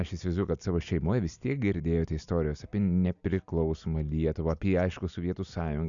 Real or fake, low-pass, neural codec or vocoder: real; 7.2 kHz; none